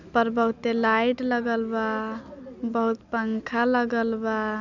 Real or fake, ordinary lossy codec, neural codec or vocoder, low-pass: real; none; none; 7.2 kHz